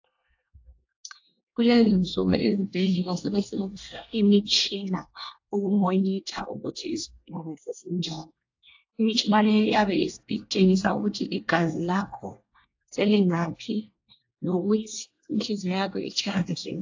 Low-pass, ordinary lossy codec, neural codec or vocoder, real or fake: 7.2 kHz; AAC, 48 kbps; codec, 24 kHz, 1 kbps, SNAC; fake